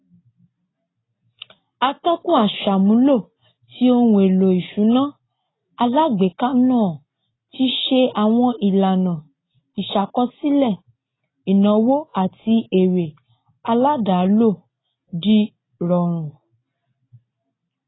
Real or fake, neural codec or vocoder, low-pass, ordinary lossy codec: real; none; 7.2 kHz; AAC, 16 kbps